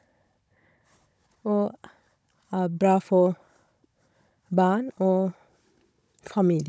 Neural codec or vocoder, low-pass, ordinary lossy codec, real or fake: codec, 16 kHz, 16 kbps, FunCodec, trained on Chinese and English, 50 frames a second; none; none; fake